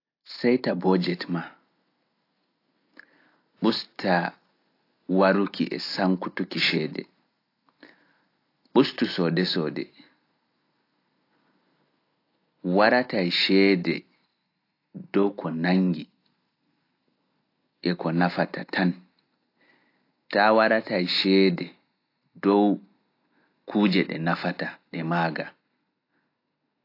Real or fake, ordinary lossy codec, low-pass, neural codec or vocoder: real; AAC, 32 kbps; 5.4 kHz; none